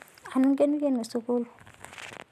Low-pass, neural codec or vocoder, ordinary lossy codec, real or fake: 14.4 kHz; none; none; real